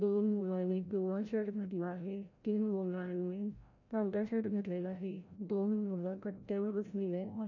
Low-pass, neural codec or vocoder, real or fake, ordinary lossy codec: 7.2 kHz; codec, 16 kHz, 0.5 kbps, FreqCodec, larger model; fake; none